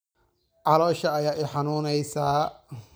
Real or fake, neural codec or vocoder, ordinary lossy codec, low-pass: real; none; none; none